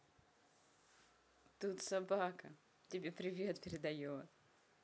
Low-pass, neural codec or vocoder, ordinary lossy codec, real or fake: none; none; none; real